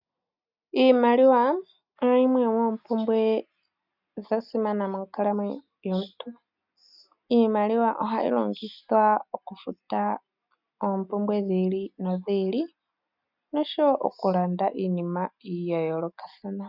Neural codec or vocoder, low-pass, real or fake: none; 5.4 kHz; real